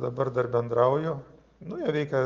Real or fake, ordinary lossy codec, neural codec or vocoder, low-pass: real; Opus, 24 kbps; none; 7.2 kHz